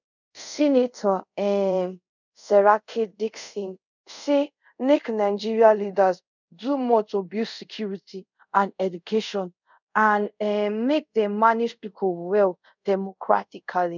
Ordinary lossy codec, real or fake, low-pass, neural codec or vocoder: none; fake; 7.2 kHz; codec, 24 kHz, 0.5 kbps, DualCodec